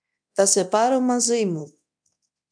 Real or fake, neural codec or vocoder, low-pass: fake; codec, 24 kHz, 0.9 kbps, DualCodec; 9.9 kHz